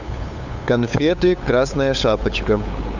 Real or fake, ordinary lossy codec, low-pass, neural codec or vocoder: fake; Opus, 64 kbps; 7.2 kHz; codec, 16 kHz, 8 kbps, FunCodec, trained on LibriTTS, 25 frames a second